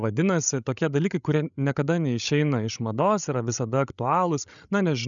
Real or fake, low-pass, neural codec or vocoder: fake; 7.2 kHz; codec, 16 kHz, 16 kbps, FreqCodec, larger model